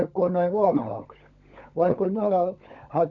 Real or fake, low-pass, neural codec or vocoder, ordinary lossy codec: fake; 7.2 kHz; codec, 16 kHz, 16 kbps, FunCodec, trained on LibriTTS, 50 frames a second; none